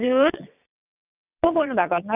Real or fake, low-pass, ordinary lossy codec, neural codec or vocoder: fake; 3.6 kHz; none; vocoder, 22.05 kHz, 80 mel bands, WaveNeXt